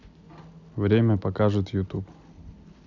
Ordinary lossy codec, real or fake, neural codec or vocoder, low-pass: none; real; none; 7.2 kHz